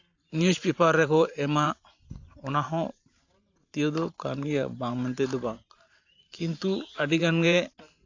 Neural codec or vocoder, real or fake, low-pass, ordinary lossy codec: vocoder, 44.1 kHz, 128 mel bands every 512 samples, BigVGAN v2; fake; 7.2 kHz; AAC, 48 kbps